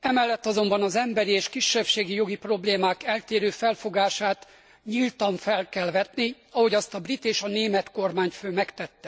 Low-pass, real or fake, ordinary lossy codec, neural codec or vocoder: none; real; none; none